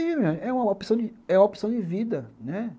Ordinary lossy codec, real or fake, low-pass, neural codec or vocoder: none; real; none; none